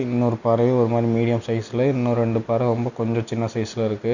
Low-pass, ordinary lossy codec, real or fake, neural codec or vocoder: 7.2 kHz; none; real; none